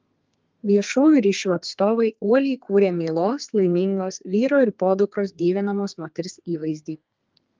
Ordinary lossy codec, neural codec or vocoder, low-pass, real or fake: Opus, 32 kbps; codec, 32 kHz, 1.9 kbps, SNAC; 7.2 kHz; fake